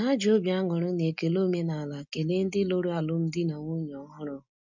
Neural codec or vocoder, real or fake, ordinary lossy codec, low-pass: none; real; none; 7.2 kHz